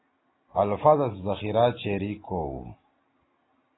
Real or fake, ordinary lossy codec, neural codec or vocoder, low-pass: real; AAC, 16 kbps; none; 7.2 kHz